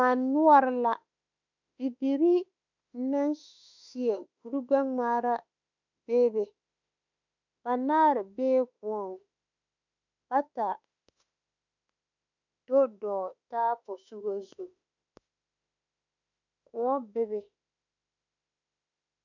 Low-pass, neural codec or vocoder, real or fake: 7.2 kHz; autoencoder, 48 kHz, 32 numbers a frame, DAC-VAE, trained on Japanese speech; fake